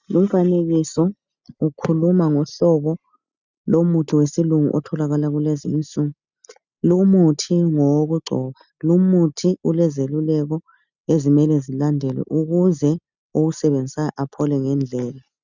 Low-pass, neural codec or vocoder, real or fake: 7.2 kHz; none; real